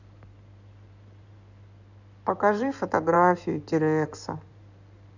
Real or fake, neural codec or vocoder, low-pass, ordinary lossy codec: fake; codec, 16 kHz in and 24 kHz out, 2.2 kbps, FireRedTTS-2 codec; 7.2 kHz; none